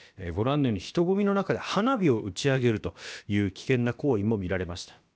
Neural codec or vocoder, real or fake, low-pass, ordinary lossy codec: codec, 16 kHz, about 1 kbps, DyCAST, with the encoder's durations; fake; none; none